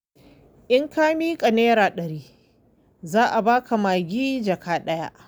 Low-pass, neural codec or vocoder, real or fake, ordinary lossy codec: none; none; real; none